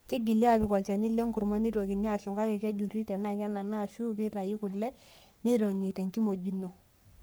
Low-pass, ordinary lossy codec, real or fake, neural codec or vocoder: none; none; fake; codec, 44.1 kHz, 3.4 kbps, Pupu-Codec